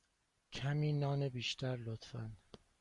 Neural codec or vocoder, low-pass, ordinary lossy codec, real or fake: none; 10.8 kHz; Opus, 64 kbps; real